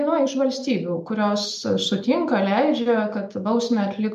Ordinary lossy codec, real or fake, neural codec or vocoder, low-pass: MP3, 64 kbps; real; none; 14.4 kHz